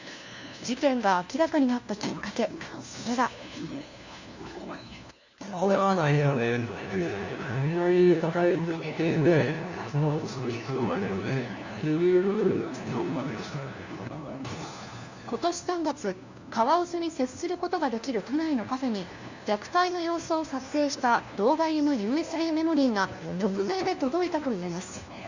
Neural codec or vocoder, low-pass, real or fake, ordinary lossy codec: codec, 16 kHz, 1 kbps, FunCodec, trained on LibriTTS, 50 frames a second; 7.2 kHz; fake; Opus, 64 kbps